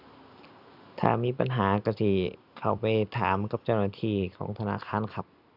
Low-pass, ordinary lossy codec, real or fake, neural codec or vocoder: 5.4 kHz; none; real; none